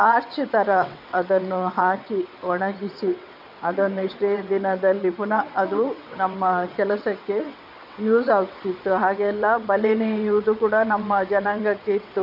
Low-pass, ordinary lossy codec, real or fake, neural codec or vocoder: 5.4 kHz; none; fake; vocoder, 22.05 kHz, 80 mel bands, WaveNeXt